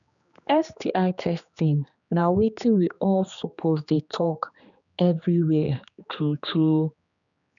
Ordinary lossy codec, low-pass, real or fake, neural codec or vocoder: none; 7.2 kHz; fake; codec, 16 kHz, 2 kbps, X-Codec, HuBERT features, trained on general audio